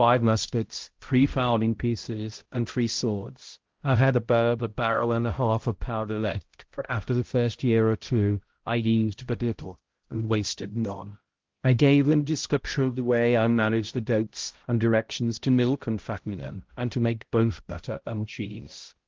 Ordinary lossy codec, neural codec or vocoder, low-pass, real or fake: Opus, 16 kbps; codec, 16 kHz, 0.5 kbps, X-Codec, HuBERT features, trained on balanced general audio; 7.2 kHz; fake